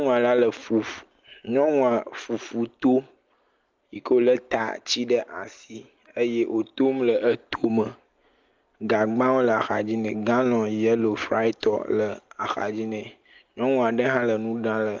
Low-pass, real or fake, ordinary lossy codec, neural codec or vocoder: 7.2 kHz; real; Opus, 32 kbps; none